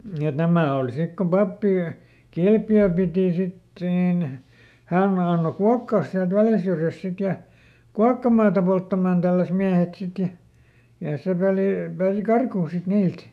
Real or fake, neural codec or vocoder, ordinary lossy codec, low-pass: fake; autoencoder, 48 kHz, 128 numbers a frame, DAC-VAE, trained on Japanese speech; none; 14.4 kHz